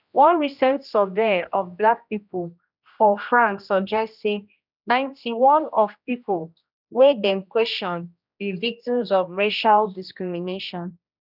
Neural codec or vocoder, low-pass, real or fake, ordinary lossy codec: codec, 16 kHz, 1 kbps, X-Codec, HuBERT features, trained on general audio; 5.4 kHz; fake; none